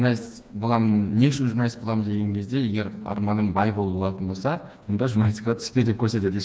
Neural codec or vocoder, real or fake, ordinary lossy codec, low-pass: codec, 16 kHz, 2 kbps, FreqCodec, smaller model; fake; none; none